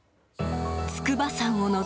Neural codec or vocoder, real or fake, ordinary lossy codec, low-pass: none; real; none; none